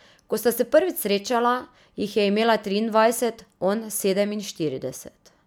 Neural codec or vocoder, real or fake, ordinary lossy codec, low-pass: none; real; none; none